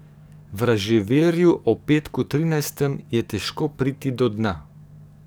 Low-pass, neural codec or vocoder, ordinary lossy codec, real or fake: none; codec, 44.1 kHz, 7.8 kbps, DAC; none; fake